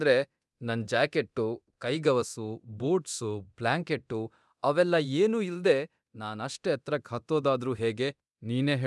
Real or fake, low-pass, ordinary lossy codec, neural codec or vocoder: fake; none; none; codec, 24 kHz, 0.9 kbps, DualCodec